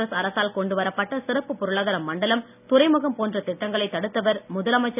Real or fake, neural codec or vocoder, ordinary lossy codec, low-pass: real; none; none; 3.6 kHz